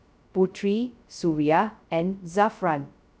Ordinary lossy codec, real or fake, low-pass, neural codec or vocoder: none; fake; none; codec, 16 kHz, 0.2 kbps, FocalCodec